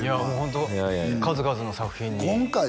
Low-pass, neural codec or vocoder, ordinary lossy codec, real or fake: none; none; none; real